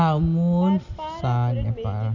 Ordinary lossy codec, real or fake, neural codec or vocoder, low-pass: none; real; none; 7.2 kHz